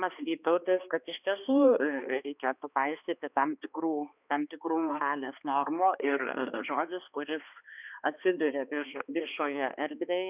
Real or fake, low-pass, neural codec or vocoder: fake; 3.6 kHz; codec, 16 kHz, 2 kbps, X-Codec, HuBERT features, trained on balanced general audio